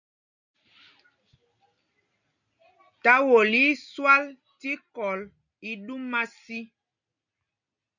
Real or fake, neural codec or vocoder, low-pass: real; none; 7.2 kHz